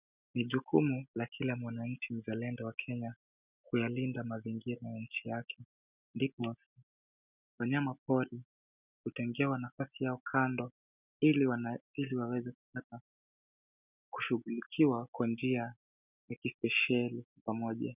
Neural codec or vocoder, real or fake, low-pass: none; real; 3.6 kHz